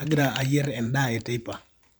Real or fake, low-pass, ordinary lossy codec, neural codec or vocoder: fake; none; none; vocoder, 44.1 kHz, 128 mel bands every 512 samples, BigVGAN v2